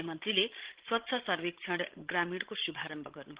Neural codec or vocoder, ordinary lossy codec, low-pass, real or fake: codec, 16 kHz, 16 kbps, FreqCodec, larger model; Opus, 16 kbps; 3.6 kHz; fake